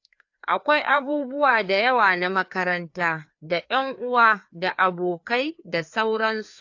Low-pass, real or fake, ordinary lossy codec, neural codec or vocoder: 7.2 kHz; fake; AAC, 48 kbps; codec, 16 kHz, 2 kbps, FreqCodec, larger model